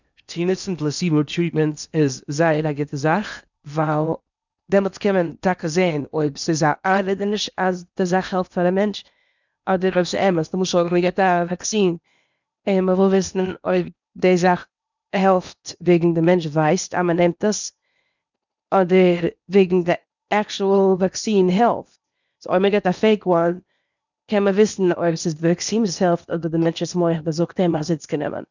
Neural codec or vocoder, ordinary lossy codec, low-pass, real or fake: codec, 16 kHz in and 24 kHz out, 0.8 kbps, FocalCodec, streaming, 65536 codes; none; 7.2 kHz; fake